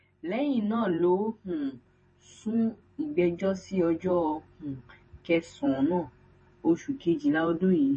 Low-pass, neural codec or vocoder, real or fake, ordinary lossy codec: 10.8 kHz; vocoder, 44.1 kHz, 128 mel bands every 512 samples, BigVGAN v2; fake; MP3, 32 kbps